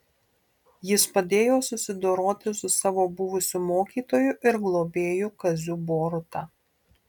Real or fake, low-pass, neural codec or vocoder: real; 19.8 kHz; none